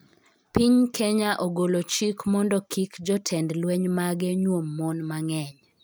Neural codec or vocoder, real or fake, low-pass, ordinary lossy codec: none; real; none; none